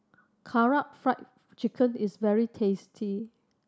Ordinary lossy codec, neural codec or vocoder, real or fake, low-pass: none; none; real; none